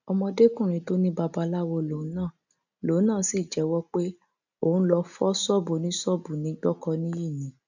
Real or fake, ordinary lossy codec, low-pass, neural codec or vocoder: real; none; 7.2 kHz; none